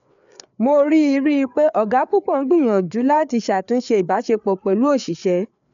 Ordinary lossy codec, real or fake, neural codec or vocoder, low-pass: none; fake; codec, 16 kHz, 4 kbps, FreqCodec, larger model; 7.2 kHz